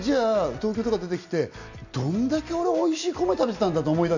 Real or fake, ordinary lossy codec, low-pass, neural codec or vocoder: real; none; 7.2 kHz; none